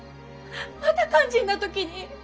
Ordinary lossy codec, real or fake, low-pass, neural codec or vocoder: none; real; none; none